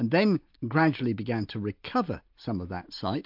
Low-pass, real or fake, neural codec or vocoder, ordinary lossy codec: 5.4 kHz; real; none; MP3, 48 kbps